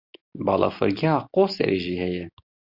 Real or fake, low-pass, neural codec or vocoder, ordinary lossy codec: real; 5.4 kHz; none; Opus, 64 kbps